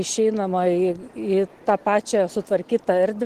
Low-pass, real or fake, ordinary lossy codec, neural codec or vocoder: 14.4 kHz; real; Opus, 16 kbps; none